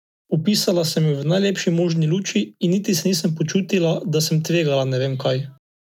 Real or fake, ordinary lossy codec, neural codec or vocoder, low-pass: real; none; none; 19.8 kHz